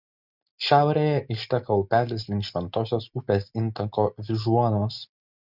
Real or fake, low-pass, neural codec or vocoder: real; 5.4 kHz; none